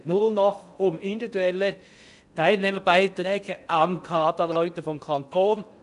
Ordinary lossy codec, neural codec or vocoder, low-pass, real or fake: none; codec, 16 kHz in and 24 kHz out, 0.6 kbps, FocalCodec, streaming, 4096 codes; 10.8 kHz; fake